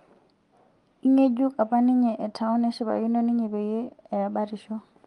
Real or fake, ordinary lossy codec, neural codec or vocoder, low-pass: real; Opus, 32 kbps; none; 10.8 kHz